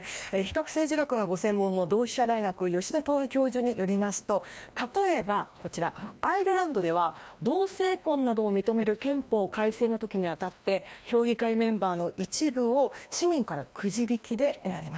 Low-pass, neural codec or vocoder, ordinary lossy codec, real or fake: none; codec, 16 kHz, 1 kbps, FreqCodec, larger model; none; fake